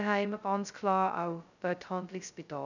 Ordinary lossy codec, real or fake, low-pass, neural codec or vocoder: none; fake; 7.2 kHz; codec, 16 kHz, 0.2 kbps, FocalCodec